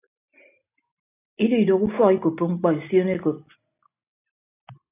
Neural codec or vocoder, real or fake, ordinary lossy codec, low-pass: none; real; AAC, 24 kbps; 3.6 kHz